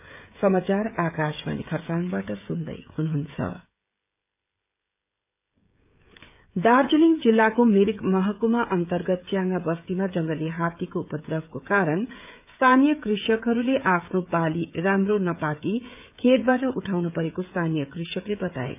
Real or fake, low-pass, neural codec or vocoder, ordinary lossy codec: fake; 3.6 kHz; codec, 16 kHz, 16 kbps, FreqCodec, smaller model; none